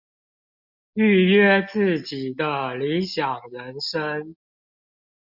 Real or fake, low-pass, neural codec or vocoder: real; 5.4 kHz; none